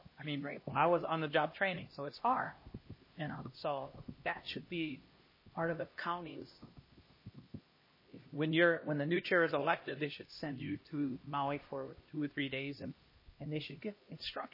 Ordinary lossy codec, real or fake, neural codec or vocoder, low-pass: MP3, 24 kbps; fake; codec, 16 kHz, 1 kbps, X-Codec, HuBERT features, trained on LibriSpeech; 5.4 kHz